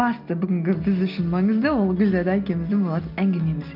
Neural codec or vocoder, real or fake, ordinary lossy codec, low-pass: none; real; Opus, 24 kbps; 5.4 kHz